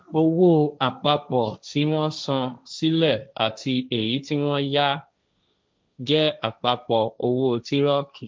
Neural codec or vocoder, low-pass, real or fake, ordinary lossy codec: codec, 16 kHz, 1.1 kbps, Voila-Tokenizer; none; fake; none